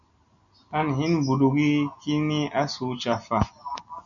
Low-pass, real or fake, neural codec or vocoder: 7.2 kHz; real; none